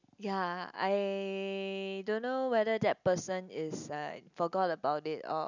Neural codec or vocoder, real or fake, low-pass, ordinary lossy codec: none; real; 7.2 kHz; none